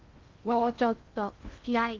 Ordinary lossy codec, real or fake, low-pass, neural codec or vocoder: Opus, 24 kbps; fake; 7.2 kHz; codec, 16 kHz in and 24 kHz out, 0.6 kbps, FocalCodec, streaming, 2048 codes